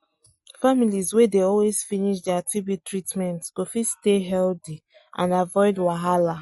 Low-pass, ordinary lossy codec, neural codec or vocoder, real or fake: 19.8 kHz; MP3, 48 kbps; none; real